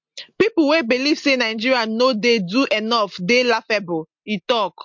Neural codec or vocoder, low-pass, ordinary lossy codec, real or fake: none; 7.2 kHz; MP3, 48 kbps; real